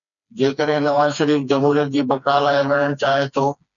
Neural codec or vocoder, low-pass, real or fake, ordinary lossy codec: codec, 16 kHz, 2 kbps, FreqCodec, smaller model; 7.2 kHz; fake; MP3, 96 kbps